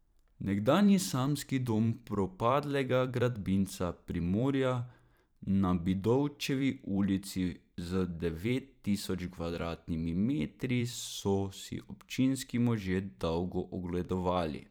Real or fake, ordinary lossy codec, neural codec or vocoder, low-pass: fake; none; vocoder, 44.1 kHz, 128 mel bands every 512 samples, BigVGAN v2; 19.8 kHz